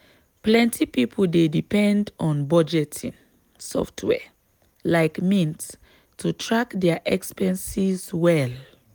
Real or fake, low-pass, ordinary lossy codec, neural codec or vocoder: real; none; none; none